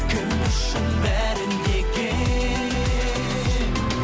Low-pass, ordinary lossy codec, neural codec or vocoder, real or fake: none; none; none; real